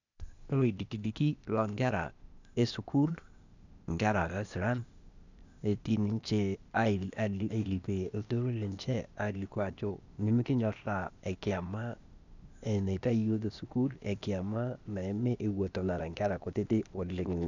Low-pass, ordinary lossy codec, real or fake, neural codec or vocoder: 7.2 kHz; none; fake; codec, 16 kHz, 0.8 kbps, ZipCodec